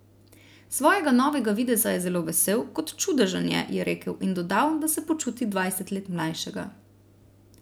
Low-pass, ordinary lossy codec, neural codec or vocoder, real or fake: none; none; none; real